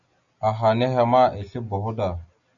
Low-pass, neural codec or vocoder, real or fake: 7.2 kHz; none; real